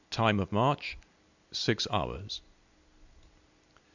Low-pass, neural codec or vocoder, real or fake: 7.2 kHz; none; real